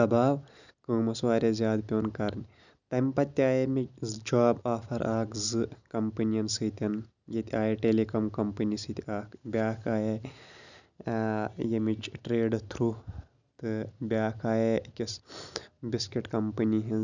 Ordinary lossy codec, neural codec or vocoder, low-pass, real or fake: none; none; 7.2 kHz; real